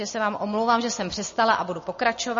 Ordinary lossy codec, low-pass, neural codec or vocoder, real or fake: MP3, 32 kbps; 7.2 kHz; none; real